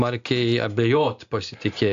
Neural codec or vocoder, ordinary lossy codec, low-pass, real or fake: none; AAC, 64 kbps; 7.2 kHz; real